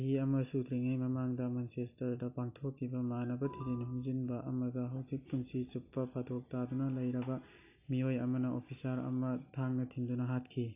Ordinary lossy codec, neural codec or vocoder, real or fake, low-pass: AAC, 24 kbps; none; real; 3.6 kHz